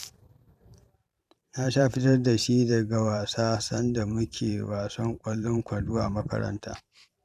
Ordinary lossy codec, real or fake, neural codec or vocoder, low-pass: none; fake; vocoder, 44.1 kHz, 128 mel bands every 512 samples, BigVGAN v2; 14.4 kHz